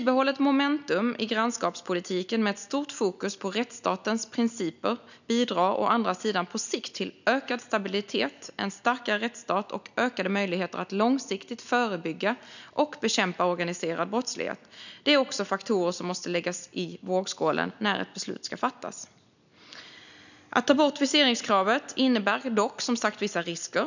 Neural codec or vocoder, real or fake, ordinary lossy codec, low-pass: none; real; none; 7.2 kHz